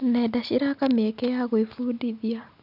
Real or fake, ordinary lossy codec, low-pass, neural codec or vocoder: real; none; 5.4 kHz; none